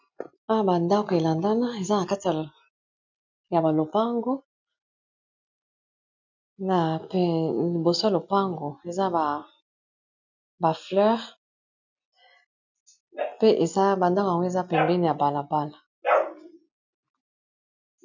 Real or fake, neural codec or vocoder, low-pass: real; none; 7.2 kHz